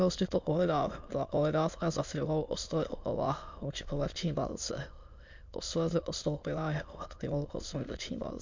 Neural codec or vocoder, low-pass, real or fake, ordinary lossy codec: autoencoder, 22.05 kHz, a latent of 192 numbers a frame, VITS, trained on many speakers; 7.2 kHz; fake; MP3, 48 kbps